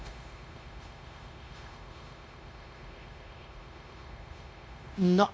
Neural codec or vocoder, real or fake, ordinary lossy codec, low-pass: codec, 16 kHz, 0.9 kbps, LongCat-Audio-Codec; fake; none; none